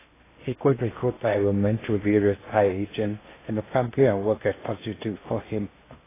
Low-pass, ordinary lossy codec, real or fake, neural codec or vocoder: 3.6 kHz; AAC, 16 kbps; fake; codec, 16 kHz in and 24 kHz out, 0.8 kbps, FocalCodec, streaming, 65536 codes